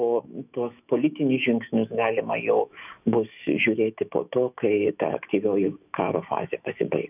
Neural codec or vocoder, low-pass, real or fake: autoencoder, 48 kHz, 128 numbers a frame, DAC-VAE, trained on Japanese speech; 3.6 kHz; fake